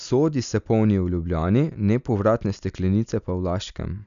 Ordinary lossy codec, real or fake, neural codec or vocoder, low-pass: none; real; none; 7.2 kHz